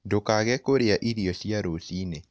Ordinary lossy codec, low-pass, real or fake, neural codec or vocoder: none; none; real; none